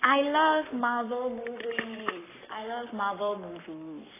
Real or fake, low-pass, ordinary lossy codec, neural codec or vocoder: fake; 3.6 kHz; AAC, 16 kbps; codec, 44.1 kHz, 7.8 kbps, Pupu-Codec